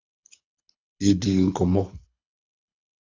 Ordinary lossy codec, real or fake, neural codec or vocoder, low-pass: AAC, 48 kbps; fake; codec, 24 kHz, 6 kbps, HILCodec; 7.2 kHz